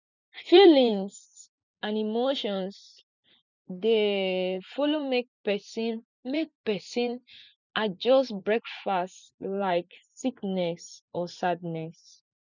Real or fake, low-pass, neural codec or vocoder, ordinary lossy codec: fake; 7.2 kHz; vocoder, 44.1 kHz, 128 mel bands every 512 samples, BigVGAN v2; none